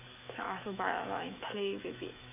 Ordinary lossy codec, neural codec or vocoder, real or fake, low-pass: none; autoencoder, 48 kHz, 128 numbers a frame, DAC-VAE, trained on Japanese speech; fake; 3.6 kHz